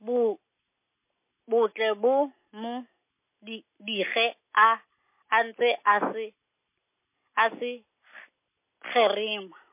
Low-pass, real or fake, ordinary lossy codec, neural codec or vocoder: 3.6 kHz; real; MP3, 24 kbps; none